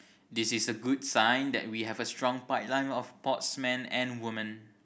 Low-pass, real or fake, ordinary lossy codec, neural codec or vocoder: none; real; none; none